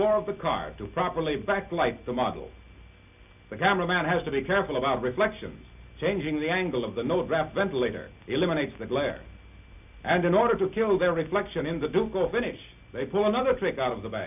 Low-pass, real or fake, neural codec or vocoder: 3.6 kHz; real; none